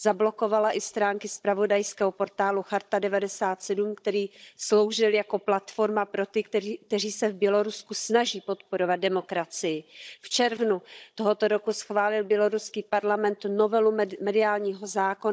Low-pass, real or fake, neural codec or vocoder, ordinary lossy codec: none; fake; codec, 16 kHz, 16 kbps, FunCodec, trained on Chinese and English, 50 frames a second; none